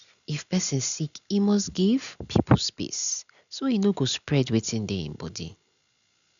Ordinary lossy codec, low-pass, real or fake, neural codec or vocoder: none; 7.2 kHz; real; none